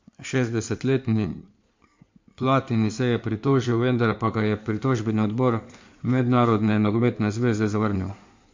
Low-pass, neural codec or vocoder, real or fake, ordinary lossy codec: 7.2 kHz; codec, 16 kHz in and 24 kHz out, 2.2 kbps, FireRedTTS-2 codec; fake; MP3, 48 kbps